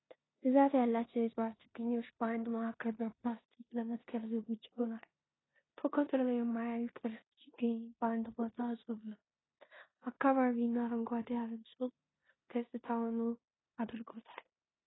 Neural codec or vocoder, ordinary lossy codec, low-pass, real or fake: codec, 16 kHz in and 24 kHz out, 0.9 kbps, LongCat-Audio-Codec, four codebook decoder; AAC, 16 kbps; 7.2 kHz; fake